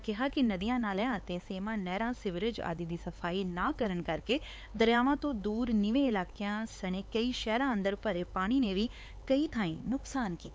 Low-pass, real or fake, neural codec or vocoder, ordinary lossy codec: none; fake; codec, 16 kHz, 4 kbps, X-Codec, HuBERT features, trained on LibriSpeech; none